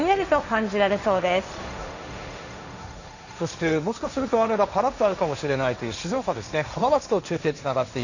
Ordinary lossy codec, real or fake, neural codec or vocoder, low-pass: none; fake; codec, 16 kHz, 1.1 kbps, Voila-Tokenizer; 7.2 kHz